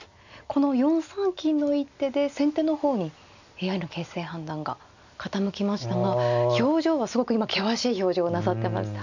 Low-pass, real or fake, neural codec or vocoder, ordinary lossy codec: 7.2 kHz; real; none; none